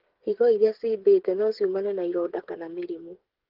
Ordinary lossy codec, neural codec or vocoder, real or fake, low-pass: Opus, 16 kbps; codec, 16 kHz, 8 kbps, FreqCodec, smaller model; fake; 5.4 kHz